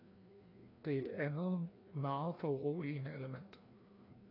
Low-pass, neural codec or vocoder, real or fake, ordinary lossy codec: 5.4 kHz; codec, 16 kHz, 1 kbps, FreqCodec, larger model; fake; AAC, 24 kbps